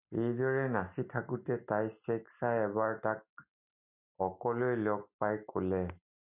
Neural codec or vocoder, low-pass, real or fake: none; 3.6 kHz; real